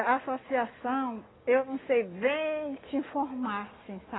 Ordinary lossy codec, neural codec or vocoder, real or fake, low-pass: AAC, 16 kbps; none; real; 7.2 kHz